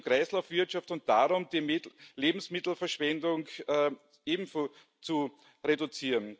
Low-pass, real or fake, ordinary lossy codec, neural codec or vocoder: none; real; none; none